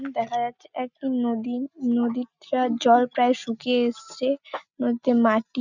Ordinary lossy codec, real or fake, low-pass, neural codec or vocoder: none; real; 7.2 kHz; none